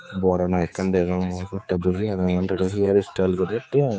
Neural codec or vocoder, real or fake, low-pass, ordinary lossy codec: codec, 16 kHz, 4 kbps, X-Codec, HuBERT features, trained on general audio; fake; none; none